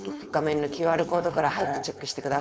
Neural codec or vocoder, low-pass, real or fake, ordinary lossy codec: codec, 16 kHz, 4.8 kbps, FACodec; none; fake; none